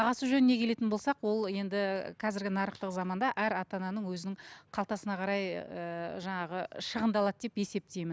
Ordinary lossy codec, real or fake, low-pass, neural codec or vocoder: none; real; none; none